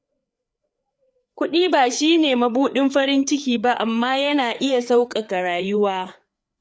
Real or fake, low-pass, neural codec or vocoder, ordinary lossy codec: fake; none; codec, 16 kHz, 4 kbps, FreqCodec, larger model; none